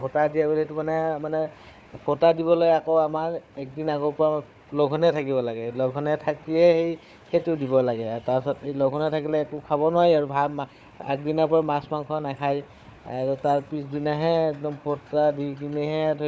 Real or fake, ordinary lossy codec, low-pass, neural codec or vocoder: fake; none; none; codec, 16 kHz, 4 kbps, FunCodec, trained on Chinese and English, 50 frames a second